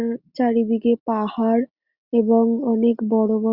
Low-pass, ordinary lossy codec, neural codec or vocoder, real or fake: 5.4 kHz; Opus, 64 kbps; none; real